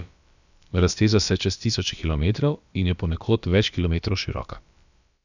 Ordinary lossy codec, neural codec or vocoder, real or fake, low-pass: none; codec, 16 kHz, about 1 kbps, DyCAST, with the encoder's durations; fake; 7.2 kHz